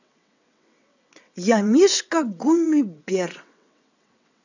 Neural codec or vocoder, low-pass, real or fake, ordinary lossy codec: vocoder, 22.05 kHz, 80 mel bands, WaveNeXt; 7.2 kHz; fake; none